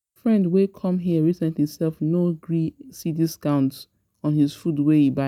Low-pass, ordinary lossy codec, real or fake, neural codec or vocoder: 19.8 kHz; none; real; none